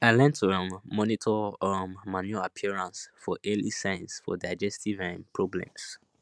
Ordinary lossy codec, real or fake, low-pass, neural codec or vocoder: none; real; none; none